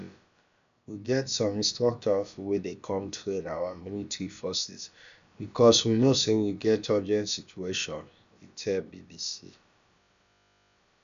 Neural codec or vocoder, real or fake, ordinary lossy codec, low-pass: codec, 16 kHz, about 1 kbps, DyCAST, with the encoder's durations; fake; AAC, 96 kbps; 7.2 kHz